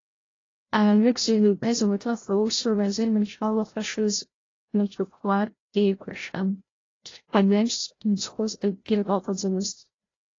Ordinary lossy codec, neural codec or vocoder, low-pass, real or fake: AAC, 32 kbps; codec, 16 kHz, 0.5 kbps, FreqCodec, larger model; 7.2 kHz; fake